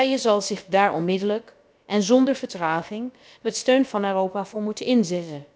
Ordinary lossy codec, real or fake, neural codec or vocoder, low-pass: none; fake; codec, 16 kHz, about 1 kbps, DyCAST, with the encoder's durations; none